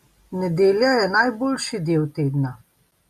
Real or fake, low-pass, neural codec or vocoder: real; 14.4 kHz; none